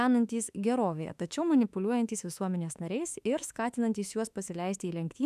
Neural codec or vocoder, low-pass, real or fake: autoencoder, 48 kHz, 32 numbers a frame, DAC-VAE, trained on Japanese speech; 14.4 kHz; fake